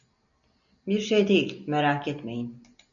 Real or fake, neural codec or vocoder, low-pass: real; none; 7.2 kHz